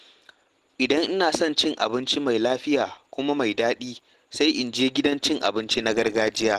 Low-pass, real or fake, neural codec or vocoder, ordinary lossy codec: 14.4 kHz; real; none; Opus, 16 kbps